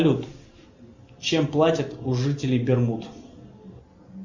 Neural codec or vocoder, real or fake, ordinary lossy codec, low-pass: none; real; Opus, 64 kbps; 7.2 kHz